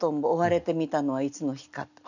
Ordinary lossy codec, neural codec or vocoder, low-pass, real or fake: none; none; 7.2 kHz; real